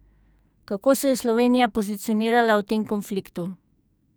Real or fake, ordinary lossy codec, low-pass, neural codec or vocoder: fake; none; none; codec, 44.1 kHz, 2.6 kbps, SNAC